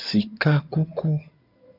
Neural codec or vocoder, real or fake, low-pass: codec, 16 kHz in and 24 kHz out, 2.2 kbps, FireRedTTS-2 codec; fake; 5.4 kHz